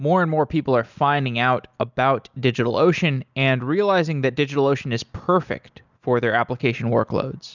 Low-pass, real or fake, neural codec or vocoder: 7.2 kHz; real; none